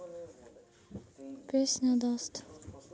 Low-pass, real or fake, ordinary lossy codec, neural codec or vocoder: none; real; none; none